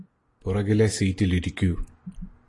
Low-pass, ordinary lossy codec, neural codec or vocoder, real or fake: 10.8 kHz; AAC, 32 kbps; none; real